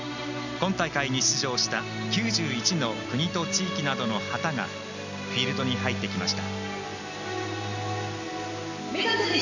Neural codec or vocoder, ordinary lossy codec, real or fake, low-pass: none; none; real; 7.2 kHz